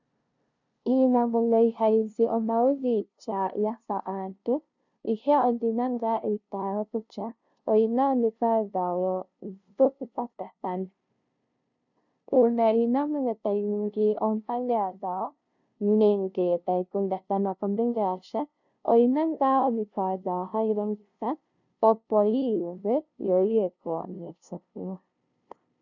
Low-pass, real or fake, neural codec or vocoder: 7.2 kHz; fake; codec, 16 kHz, 0.5 kbps, FunCodec, trained on LibriTTS, 25 frames a second